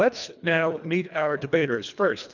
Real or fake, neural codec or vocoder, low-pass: fake; codec, 24 kHz, 1.5 kbps, HILCodec; 7.2 kHz